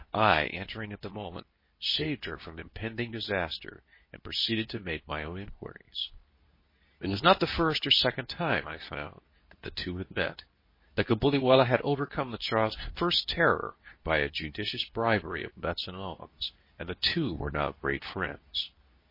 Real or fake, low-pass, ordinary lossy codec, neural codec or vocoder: fake; 5.4 kHz; MP3, 24 kbps; codec, 24 kHz, 0.9 kbps, WavTokenizer, medium speech release version 2